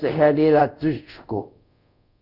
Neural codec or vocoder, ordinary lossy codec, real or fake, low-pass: codec, 24 kHz, 0.5 kbps, DualCodec; none; fake; 5.4 kHz